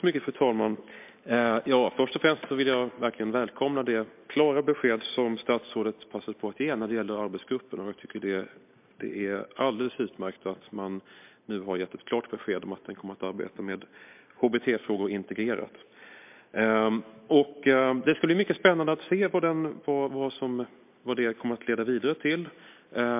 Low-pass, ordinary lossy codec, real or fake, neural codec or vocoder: 3.6 kHz; MP3, 32 kbps; real; none